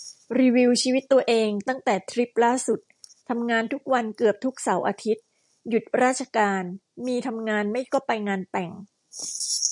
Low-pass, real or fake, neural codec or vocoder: 10.8 kHz; real; none